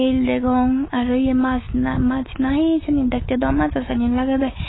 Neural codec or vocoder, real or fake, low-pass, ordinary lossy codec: autoencoder, 48 kHz, 128 numbers a frame, DAC-VAE, trained on Japanese speech; fake; 7.2 kHz; AAC, 16 kbps